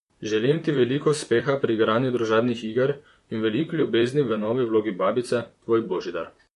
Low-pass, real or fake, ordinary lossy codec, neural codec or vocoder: 14.4 kHz; fake; MP3, 48 kbps; vocoder, 44.1 kHz, 128 mel bands, Pupu-Vocoder